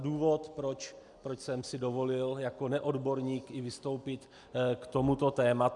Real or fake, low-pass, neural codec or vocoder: real; 10.8 kHz; none